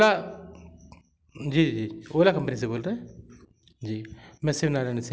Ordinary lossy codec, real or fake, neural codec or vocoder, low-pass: none; real; none; none